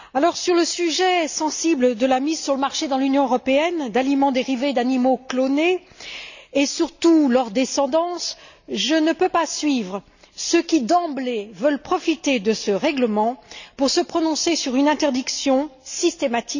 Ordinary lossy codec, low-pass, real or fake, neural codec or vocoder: none; 7.2 kHz; real; none